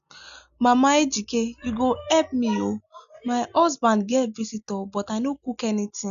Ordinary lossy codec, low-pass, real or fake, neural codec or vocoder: none; 7.2 kHz; real; none